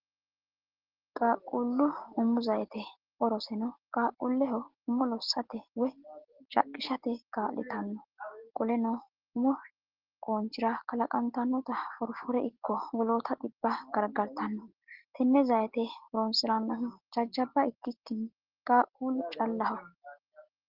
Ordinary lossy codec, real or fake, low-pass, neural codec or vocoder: Opus, 16 kbps; real; 5.4 kHz; none